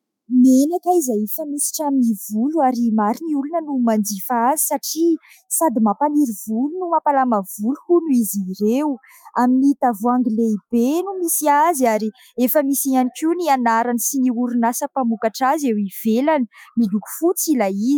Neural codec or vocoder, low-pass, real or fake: autoencoder, 48 kHz, 128 numbers a frame, DAC-VAE, trained on Japanese speech; 19.8 kHz; fake